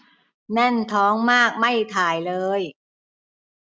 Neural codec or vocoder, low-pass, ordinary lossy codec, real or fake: none; none; none; real